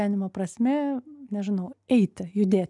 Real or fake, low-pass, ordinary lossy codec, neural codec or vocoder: real; 10.8 kHz; MP3, 96 kbps; none